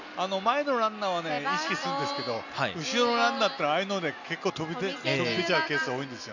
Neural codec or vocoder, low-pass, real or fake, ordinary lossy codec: none; 7.2 kHz; real; none